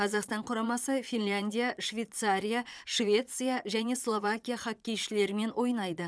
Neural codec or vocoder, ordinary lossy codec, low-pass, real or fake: vocoder, 22.05 kHz, 80 mel bands, Vocos; none; none; fake